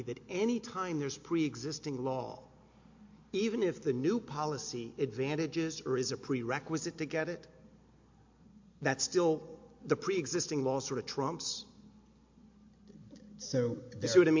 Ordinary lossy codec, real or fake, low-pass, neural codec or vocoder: MP3, 48 kbps; real; 7.2 kHz; none